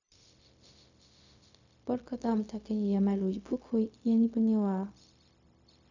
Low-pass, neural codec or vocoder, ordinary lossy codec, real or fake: 7.2 kHz; codec, 16 kHz, 0.4 kbps, LongCat-Audio-Codec; none; fake